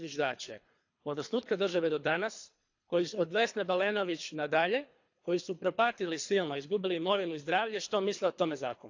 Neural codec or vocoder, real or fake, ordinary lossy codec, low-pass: codec, 24 kHz, 3 kbps, HILCodec; fake; AAC, 48 kbps; 7.2 kHz